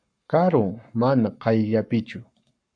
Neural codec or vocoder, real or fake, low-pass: codec, 44.1 kHz, 7.8 kbps, Pupu-Codec; fake; 9.9 kHz